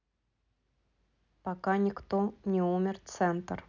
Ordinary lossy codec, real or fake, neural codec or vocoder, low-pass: none; real; none; 7.2 kHz